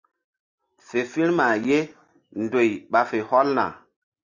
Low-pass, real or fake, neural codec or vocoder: 7.2 kHz; real; none